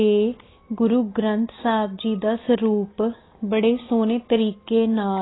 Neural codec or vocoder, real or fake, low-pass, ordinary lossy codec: none; real; 7.2 kHz; AAC, 16 kbps